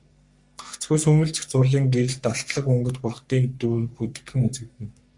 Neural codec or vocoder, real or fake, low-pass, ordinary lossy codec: codec, 44.1 kHz, 3.4 kbps, Pupu-Codec; fake; 10.8 kHz; MP3, 64 kbps